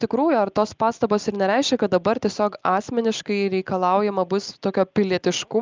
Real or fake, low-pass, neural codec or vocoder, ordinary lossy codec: real; 7.2 kHz; none; Opus, 24 kbps